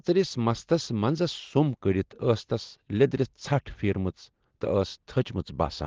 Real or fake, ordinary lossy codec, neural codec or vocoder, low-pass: real; Opus, 16 kbps; none; 7.2 kHz